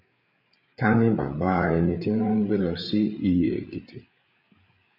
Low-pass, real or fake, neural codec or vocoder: 5.4 kHz; fake; codec, 16 kHz, 8 kbps, FreqCodec, larger model